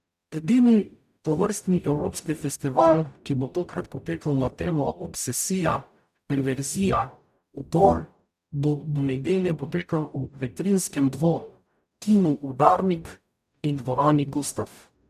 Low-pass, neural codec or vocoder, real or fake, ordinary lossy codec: 14.4 kHz; codec, 44.1 kHz, 0.9 kbps, DAC; fake; none